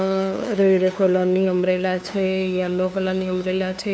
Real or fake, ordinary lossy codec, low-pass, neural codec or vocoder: fake; none; none; codec, 16 kHz, 2 kbps, FunCodec, trained on LibriTTS, 25 frames a second